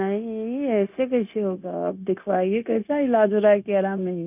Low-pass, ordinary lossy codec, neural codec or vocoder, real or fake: 3.6 kHz; none; codec, 16 kHz, 0.9 kbps, LongCat-Audio-Codec; fake